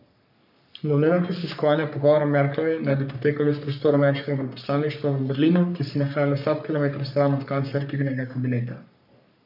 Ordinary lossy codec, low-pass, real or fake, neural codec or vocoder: none; 5.4 kHz; fake; codec, 44.1 kHz, 3.4 kbps, Pupu-Codec